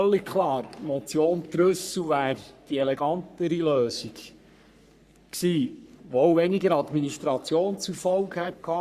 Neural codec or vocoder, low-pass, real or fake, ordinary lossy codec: codec, 44.1 kHz, 3.4 kbps, Pupu-Codec; 14.4 kHz; fake; Opus, 64 kbps